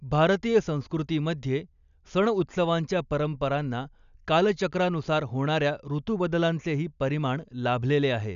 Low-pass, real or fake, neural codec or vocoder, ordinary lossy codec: 7.2 kHz; real; none; none